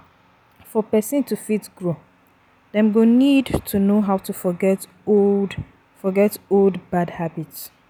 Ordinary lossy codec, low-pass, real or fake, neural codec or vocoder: none; none; real; none